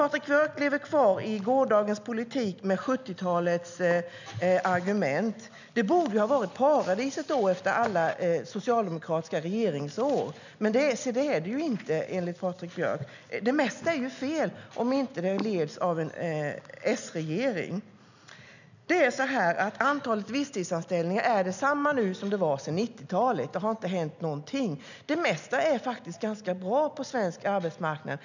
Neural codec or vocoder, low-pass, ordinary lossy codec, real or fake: none; 7.2 kHz; none; real